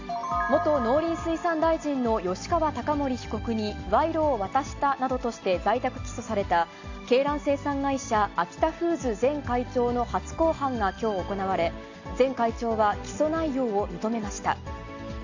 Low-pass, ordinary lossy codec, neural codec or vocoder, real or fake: 7.2 kHz; AAC, 48 kbps; none; real